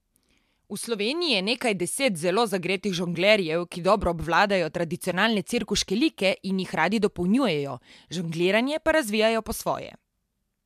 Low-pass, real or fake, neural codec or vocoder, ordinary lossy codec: 14.4 kHz; real; none; MP3, 96 kbps